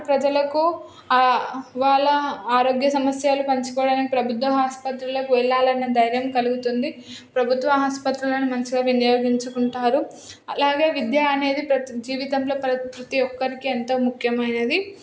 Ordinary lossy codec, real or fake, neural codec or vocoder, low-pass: none; real; none; none